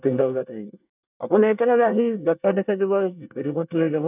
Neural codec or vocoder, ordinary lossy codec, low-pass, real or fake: codec, 24 kHz, 1 kbps, SNAC; none; 3.6 kHz; fake